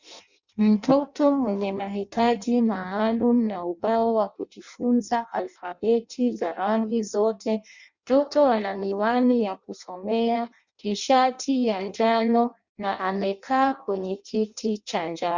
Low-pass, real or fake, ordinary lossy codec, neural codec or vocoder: 7.2 kHz; fake; Opus, 64 kbps; codec, 16 kHz in and 24 kHz out, 0.6 kbps, FireRedTTS-2 codec